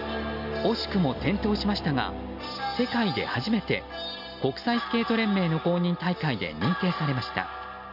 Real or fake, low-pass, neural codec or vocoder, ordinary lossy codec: real; 5.4 kHz; none; none